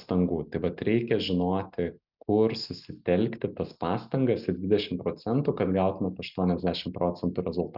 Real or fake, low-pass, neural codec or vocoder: real; 5.4 kHz; none